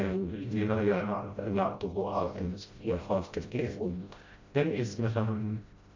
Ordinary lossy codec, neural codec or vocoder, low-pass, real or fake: MP3, 48 kbps; codec, 16 kHz, 0.5 kbps, FreqCodec, smaller model; 7.2 kHz; fake